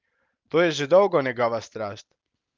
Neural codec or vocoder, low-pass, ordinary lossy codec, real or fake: none; 7.2 kHz; Opus, 32 kbps; real